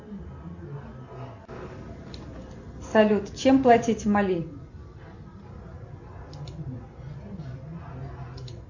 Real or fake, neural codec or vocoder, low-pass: real; none; 7.2 kHz